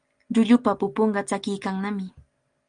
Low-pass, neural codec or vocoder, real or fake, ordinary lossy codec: 9.9 kHz; none; real; Opus, 32 kbps